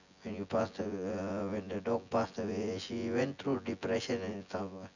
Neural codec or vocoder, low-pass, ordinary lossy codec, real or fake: vocoder, 24 kHz, 100 mel bands, Vocos; 7.2 kHz; none; fake